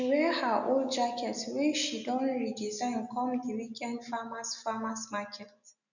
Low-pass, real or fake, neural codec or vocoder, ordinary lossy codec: 7.2 kHz; real; none; none